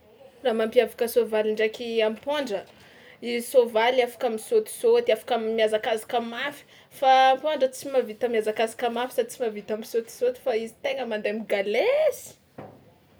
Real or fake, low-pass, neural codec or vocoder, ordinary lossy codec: real; none; none; none